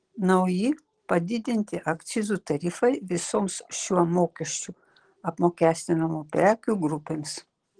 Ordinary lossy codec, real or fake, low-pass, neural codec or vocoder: Opus, 16 kbps; real; 9.9 kHz; none